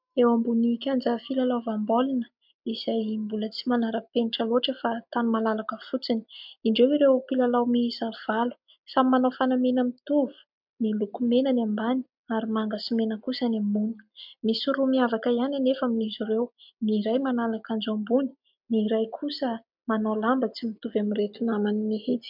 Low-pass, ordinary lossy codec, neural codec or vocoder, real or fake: 5.4 kHz; MP3, 48 kbps; none; real